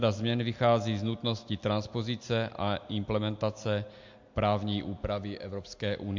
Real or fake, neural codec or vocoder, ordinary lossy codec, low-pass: real; none; MP3, 48 kbps; 7.2 kHz